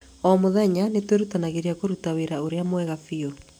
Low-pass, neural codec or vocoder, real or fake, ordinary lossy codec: 19.8 kHz; none; real; none